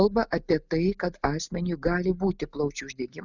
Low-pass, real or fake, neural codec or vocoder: 7.2 kHz; real; none